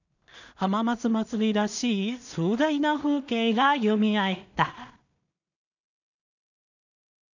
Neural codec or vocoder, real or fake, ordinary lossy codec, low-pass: codec, 16 kHz in and 24 kHz out, 0.4 kbps, LongCat-Audio-Codec, two codebook decoder; fake; none; 7.2 kHz